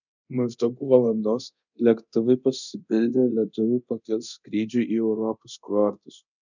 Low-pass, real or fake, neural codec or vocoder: 7.2 kHz; fake; codec, 24 kHz, 0.9 kbps, DualCodec